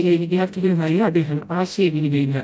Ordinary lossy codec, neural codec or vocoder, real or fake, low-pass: none; codec, 16 kHz, 0.5 kbps, FreqCodec, smaller model; fake; none